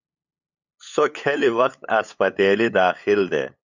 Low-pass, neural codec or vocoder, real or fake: 7.2 kHz; codec, 16 kHz, 8 kbps, FunCodec, trained on LibriTTS, 25 frames a second; fake